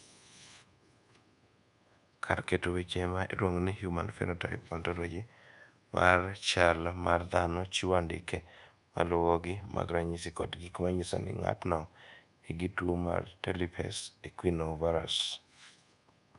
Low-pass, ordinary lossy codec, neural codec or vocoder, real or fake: 10.8 kHz; none; codec, 24 kHz, 1.2 kbps, DualCodec; fake